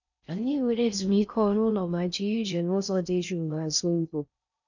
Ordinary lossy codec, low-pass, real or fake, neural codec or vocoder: none; 7.2 kHz; fake; codec, 16 kHz in and 24 kHz out, 0.6 kbps, FocalCodec, streaming, 4096 codes